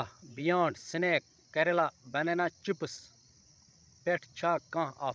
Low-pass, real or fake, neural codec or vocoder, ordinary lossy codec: none; fake; codec, 16 kHz, 16 kbps, FreqCodec, larger model; none